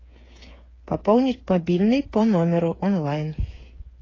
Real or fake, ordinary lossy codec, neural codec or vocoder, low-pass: fake; AAC, 32 kbps; codec, 16 kHz, 8 kbps, FreqCodec, smaller model; 7.2 kHz